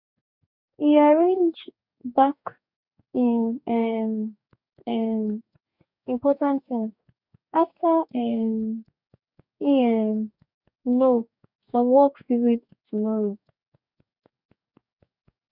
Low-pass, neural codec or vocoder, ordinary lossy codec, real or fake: 5.4 kHz; codec, 44.1 kHz, 2.6 kbps, DAC; none; fake